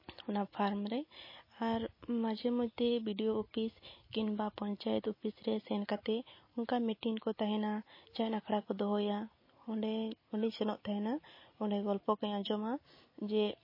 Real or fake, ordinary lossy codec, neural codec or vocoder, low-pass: real; MP3, 24 kbps; none; 5.4 kHz